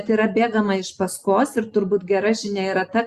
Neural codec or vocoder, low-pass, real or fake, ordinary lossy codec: none; 14.4 kHz; real; Opus, 64 kbps